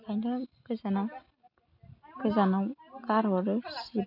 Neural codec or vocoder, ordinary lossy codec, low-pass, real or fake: none; none; 5.4 kHz; real